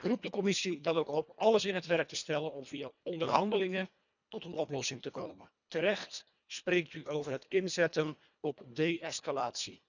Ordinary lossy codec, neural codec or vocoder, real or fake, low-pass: none; codec, 24 kHz, 1.5 kbps, HILCodec; fake; 7.2 kHz